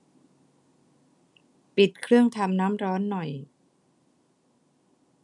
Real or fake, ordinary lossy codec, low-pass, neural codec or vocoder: real; none; 10.8 kHz; none